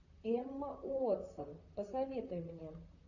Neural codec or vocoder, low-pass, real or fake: vocoder, 44.1 kHz, 128 mel bands, Pupu-Vocoder; 7.2 kHz; fake